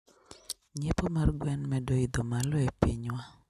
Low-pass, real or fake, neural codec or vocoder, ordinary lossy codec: 14.4 kHz; real; none; none